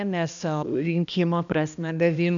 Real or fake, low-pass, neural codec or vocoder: fake; 7.2 kHz; codec, 16 kHz, 1 kbps, X-Codec, HuBERT features, trained on balanced general audio